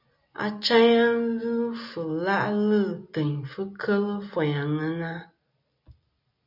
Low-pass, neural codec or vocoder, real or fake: 5.4 kHz; none; real